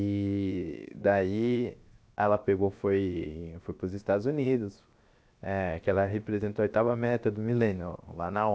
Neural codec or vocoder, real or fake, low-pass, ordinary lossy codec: codec, 16 kHz, 0.7 kbps, FocalCodec; fake; none; none